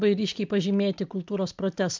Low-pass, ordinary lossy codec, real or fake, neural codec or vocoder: 7.2 kHz; MP3, 64 kbps; real; none